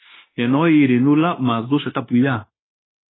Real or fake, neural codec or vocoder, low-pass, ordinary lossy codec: fake; codec, 16 kHz, 0.9 kbps, LongCat-Audio-Codec; 7.2 kHz; AAC, 16 kbps